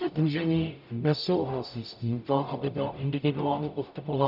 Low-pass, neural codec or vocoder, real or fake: 5.4 kHz; codec, 44.1 kHz, 0.9 kbps, DAC; fake